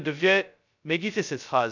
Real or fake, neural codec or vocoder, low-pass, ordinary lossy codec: fake; codec, 16 kHz, 0.2 kbps, FocalCodec; 7.2 kHz; none